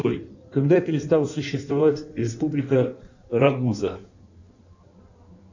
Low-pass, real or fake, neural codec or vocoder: 7.2 kHz; fake; codec, 16 kHz in and 24 kHz out, 1.1 kbps, FireRedTTS-2 codec